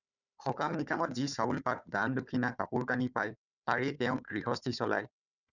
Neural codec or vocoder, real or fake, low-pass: codec, 16 kHz, 4 kbps, FunCodec, trained on Chinese and English, 50 frames a second; fake; 7.2 kHz